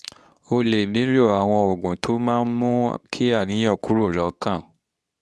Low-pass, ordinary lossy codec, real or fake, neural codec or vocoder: none; none; fake; codec, 24 kHz, 0.9 kbps, WavTokenizer, medium speech release version 2